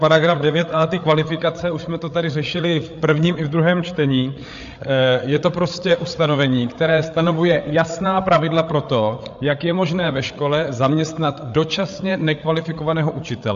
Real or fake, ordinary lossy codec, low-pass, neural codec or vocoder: fake; AAC, 64 kbps; 7.2 kHz; codec, 16 kHz, 8 kbps, FreqCodec, larger model